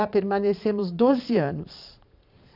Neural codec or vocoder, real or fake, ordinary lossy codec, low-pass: vocoder, 22.05 kHz, 80 mel bands, Vocos; fake; none; 5.4 kHz